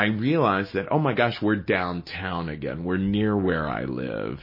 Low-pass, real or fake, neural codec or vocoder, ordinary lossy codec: 5.4 kHz; real; none; MP3, 24 kbps